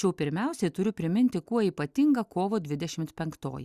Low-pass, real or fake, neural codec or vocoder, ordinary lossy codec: 14.4 kHz; real; none; Opus, 64 kbps